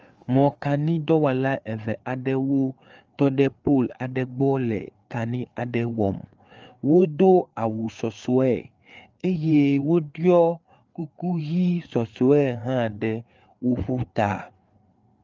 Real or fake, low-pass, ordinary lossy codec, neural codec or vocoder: fake; 7.2 kHz; Opus, 24 kbps; codec, 16 kHz, 4 kbps, FreqCodec, larger model